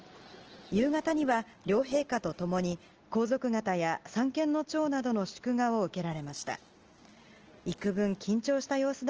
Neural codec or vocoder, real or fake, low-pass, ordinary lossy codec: none; real; 7.2 kHz; Opus, 16 kbps